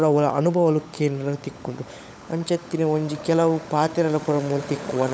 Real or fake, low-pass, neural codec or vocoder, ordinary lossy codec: fake; none; codec, 16 kHz, 16 kbps, FunCodec, trained on LibriTTS, 50 frames a second; none